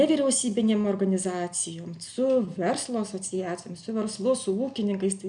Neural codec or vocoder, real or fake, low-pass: none; real; 9.9 kHz